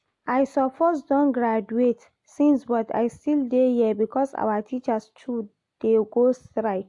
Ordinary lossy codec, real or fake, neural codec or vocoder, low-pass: AAC, 64 kbps; real; none; 10.8 kHz